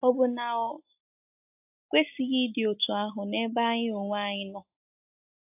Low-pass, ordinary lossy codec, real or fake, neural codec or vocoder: 3.6 kHz; AAC, 32 kbps; real; none